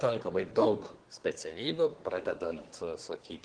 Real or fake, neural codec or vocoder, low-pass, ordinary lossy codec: fake; codec, 24 kHz, 1 kbps, SNAC; 9.9 kHz; Opus, 16 kbps